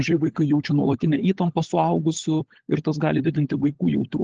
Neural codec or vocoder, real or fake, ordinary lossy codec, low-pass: codec, 16 kHz, 16 kbps, FunCodec, trained on LibriTTS, 50 frames a second; fake; Opus, 16 kbps; 7.2 kHz